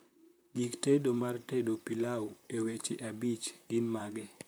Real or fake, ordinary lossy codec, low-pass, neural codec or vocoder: fake; none; none; vocoder, 44.1 kHz, 128 mel bands, Pupu-Vocoder